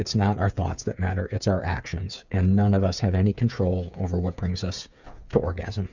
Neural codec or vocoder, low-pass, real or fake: codec, 16 kHz, 8 kbps, FreqCodec, smaller model; 7.2 kHz; fake